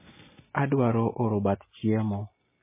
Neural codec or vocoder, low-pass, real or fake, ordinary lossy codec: none; 3.6 kHz; real; MP3, 16 kbps